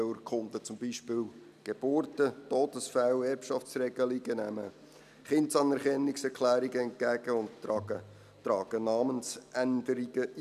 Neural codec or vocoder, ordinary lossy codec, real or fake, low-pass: none; none; real; 14.4 kHz